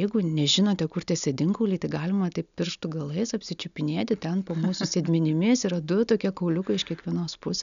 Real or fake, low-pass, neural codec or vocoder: real; 7.2 kHz; none